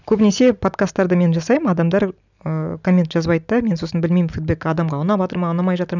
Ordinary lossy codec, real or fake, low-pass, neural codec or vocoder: none; real; 7.2 kHz; none